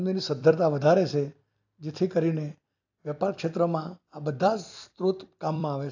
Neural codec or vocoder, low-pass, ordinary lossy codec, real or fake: vocoder, 44.1 kHz, 128 mel bands every 256 samples, BigVGAN v2; 7.2 kHz; AAC, 48 kbps; fake